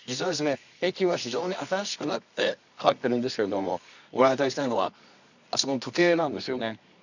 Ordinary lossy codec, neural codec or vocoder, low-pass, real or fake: none; codec, 24 kHz, 0.9 kbps, WavTokenizer, medium music audio release; 7.2 kHz; fake